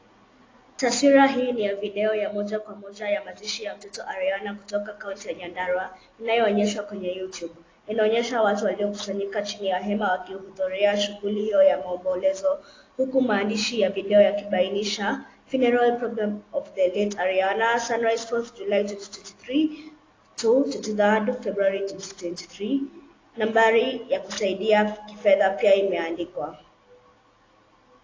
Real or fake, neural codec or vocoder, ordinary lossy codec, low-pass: real; none; AAC, 32 kbps; 7.2 kHz